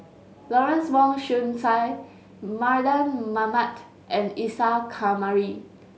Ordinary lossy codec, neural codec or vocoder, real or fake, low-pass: none; none; real; none